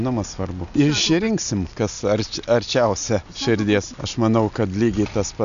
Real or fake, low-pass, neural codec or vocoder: real; 7.2 kHz; none